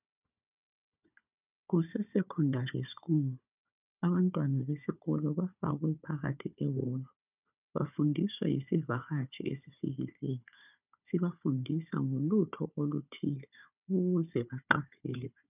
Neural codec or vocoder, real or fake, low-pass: codec, 16 kHz, 4 kbps, FunCodec, trained on Chinese and English, 50 frames a second; fake; 3.6 kHz